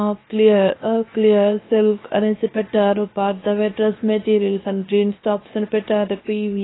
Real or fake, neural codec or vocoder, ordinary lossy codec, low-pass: fake; codec, 16 kHz, 0.7 kbps, FocalCodec; AAC, 16 kbps; 7.2 kHz